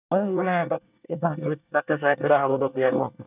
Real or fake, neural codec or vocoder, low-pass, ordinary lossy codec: fake; codec, 24 kHz, 1 kbps, SNAC; 3.6 kHz; none